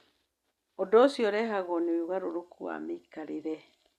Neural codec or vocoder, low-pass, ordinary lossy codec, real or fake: none; 14.4 kHz; Opus, 64 kbps; real